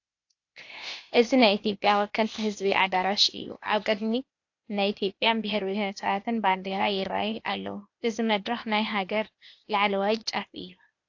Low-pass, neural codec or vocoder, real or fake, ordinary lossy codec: 7.2 kHz; codec, 16 kHz, 0.8 kbps, ZipCodec; fake; AAC, 48 kbps